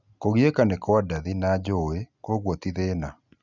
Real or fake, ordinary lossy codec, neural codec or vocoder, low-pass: real; none; none; 7.2 kHz